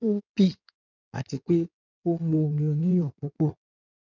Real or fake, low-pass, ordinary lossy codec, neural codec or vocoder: fake; 7.2 kHz; none; codec, 16 kHz in and 24 kHz out, 2.2 kbps, FireRedTTS-2 codec